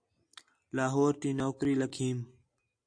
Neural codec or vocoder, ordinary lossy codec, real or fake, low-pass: none; AAC, 64 kbps; real; 9.9 kHz